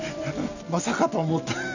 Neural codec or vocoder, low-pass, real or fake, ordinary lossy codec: none; 7.2 kHz; real; none